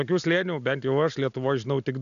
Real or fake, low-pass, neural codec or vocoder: real; 7.2 kHz; none